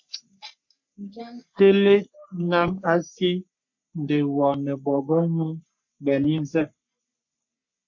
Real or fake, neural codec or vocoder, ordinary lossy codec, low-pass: fake; codec, 44.1 kHz, 3.4 kbps, Pupu-Codec; MP3, 48 kbps; 7.2 kHz